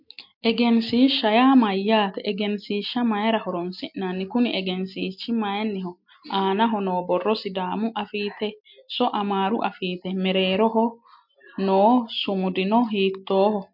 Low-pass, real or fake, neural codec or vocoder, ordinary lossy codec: 5.4 kHz; real; none; MP3, 48 kbps